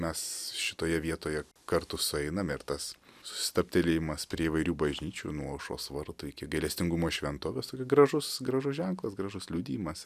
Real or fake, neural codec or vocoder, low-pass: real; none; 14.4 kHz